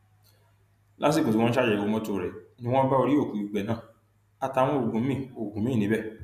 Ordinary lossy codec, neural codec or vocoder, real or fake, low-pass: none; none; real; 14.4 kHz